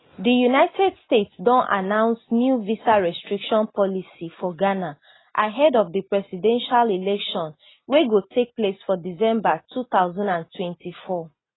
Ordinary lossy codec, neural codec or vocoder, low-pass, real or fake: AAC, 16 kbps; none; 7.2 kHz; real